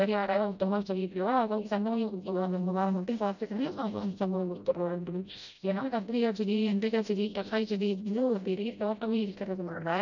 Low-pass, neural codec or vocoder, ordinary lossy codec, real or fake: 7.2 kHz; codec, 16 kHz, 0.5 kbps, FreqCodec, smaller model; none; fake